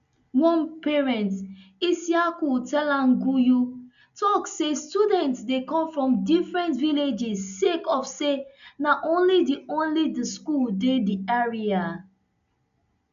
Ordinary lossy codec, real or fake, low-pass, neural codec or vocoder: none; real; 7.2 kHz; none